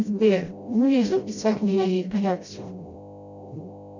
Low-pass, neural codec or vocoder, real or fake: 7.2 kHz; codec, 16 kHz, 0.5 kbps, FreqCodec, smaller model; fake